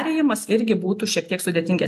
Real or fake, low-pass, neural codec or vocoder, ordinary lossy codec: real; 14.4 kHz; none; AAC, 96 kbps